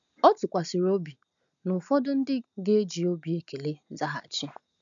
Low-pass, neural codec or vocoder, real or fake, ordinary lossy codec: 7.2 kHz; none; real; none